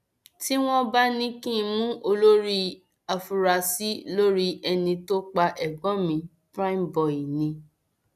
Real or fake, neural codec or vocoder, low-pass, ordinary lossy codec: real; none; 14.4 kHz; none